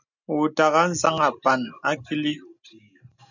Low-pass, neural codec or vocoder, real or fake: 7.2 kHz; none; real